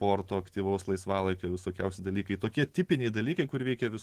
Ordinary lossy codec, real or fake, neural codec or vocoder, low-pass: Opus, 16 kbps; fake; autoencoder, 48 kHz, 128 numbers a frame, DAC-VAE, trained on Japanese speech; 14.4 kHz